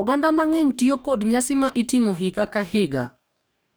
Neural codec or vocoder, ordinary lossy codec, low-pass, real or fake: codec, 44.1 kHz, 2.6 kbps, DAC; none; none; fake